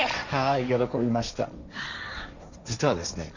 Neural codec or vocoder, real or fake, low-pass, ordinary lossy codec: codec, 16 kHz, 1.1 kbps, Voila-Tokenizer; fake; 7.2 kHz; none